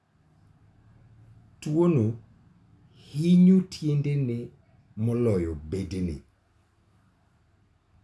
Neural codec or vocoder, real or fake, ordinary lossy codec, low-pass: none; real; none; none